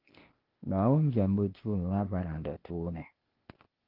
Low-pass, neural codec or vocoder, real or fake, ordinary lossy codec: 5.4 kHz; codec, 16 kHz, 0.8 kbps, ZipCodec; fake; Opus, 16 kbps